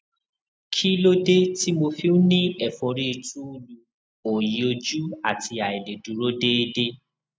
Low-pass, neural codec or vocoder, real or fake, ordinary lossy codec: none; none; real; none